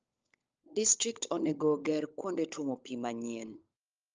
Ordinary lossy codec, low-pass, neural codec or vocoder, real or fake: Opus, 24 kbps; 7.2 kHz; codec, 16 kHz, 16 kbps, FunCodec, trained on LibriTTS, 50 frames a second; fake